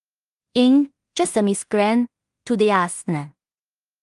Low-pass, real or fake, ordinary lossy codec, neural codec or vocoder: 10.8 kHz; fake; Opus, 32 kbps; codec, 16 kHz in and 24 kHz out, 0.4 kbps, LongCat-Audio-Codec, two codebook decoder